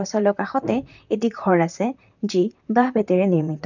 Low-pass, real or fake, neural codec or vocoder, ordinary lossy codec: 7.2 kHz; fake; vocoder, 44.1 kHz, 128 mel bands, Pupu-Vocoder; none